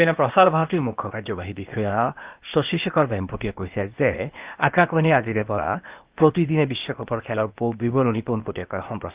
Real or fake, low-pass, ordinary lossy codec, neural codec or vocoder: fake; 3.6 kHz; Opus, 32 kbps; codec, 16 kHz, 0.8 kbps, ZipCodec